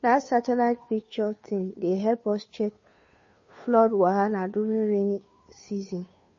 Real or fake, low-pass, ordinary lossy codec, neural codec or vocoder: fake; 7.2 kHz; MP3, 32 kbps; codec, 16 kHz, 2 kbps, FunCodec, trained on Chinese and English, 25 frames a second